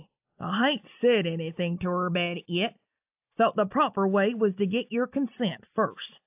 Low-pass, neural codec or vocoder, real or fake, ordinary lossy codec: 3.6 kHz; none; real; AAC, 32 kbps